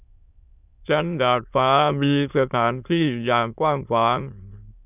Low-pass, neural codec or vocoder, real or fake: 3.6 kHz; autoencoder, 22.05 kHz, a latent of 192 numbers a frame, VITS, trained on many speakers; fake